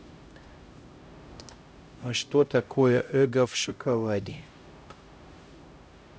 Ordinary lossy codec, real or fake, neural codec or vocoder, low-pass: none; fake; codec, 16 kHz, 0.5 kbps, X-Codec, HuBERT features, trained on LibriSpeech; none